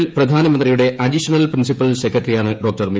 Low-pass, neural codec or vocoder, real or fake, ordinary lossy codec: none; codec, 16 kHz, 16 kbps, FreqCodec, smaller model; fake; none